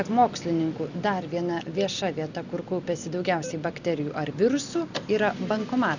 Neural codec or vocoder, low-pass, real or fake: none; 7.2 kHz; real